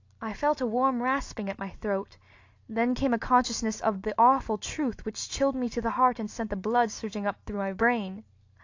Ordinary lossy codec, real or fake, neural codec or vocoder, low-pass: AAC, 48 kbps; real; none; 7.2 kHz